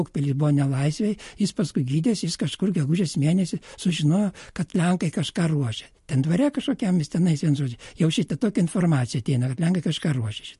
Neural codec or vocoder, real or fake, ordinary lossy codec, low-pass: none; real; MP3, 48 kbps; 14.4 kHz